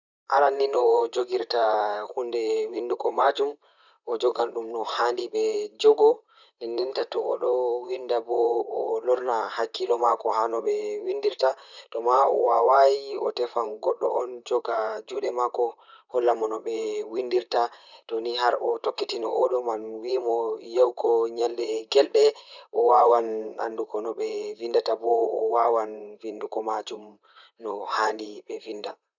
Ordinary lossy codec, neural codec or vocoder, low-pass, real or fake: none; vocoder, 44.1 kHz, 128 mel bands, Pupu-Vocoder; 7.2 kHz; fake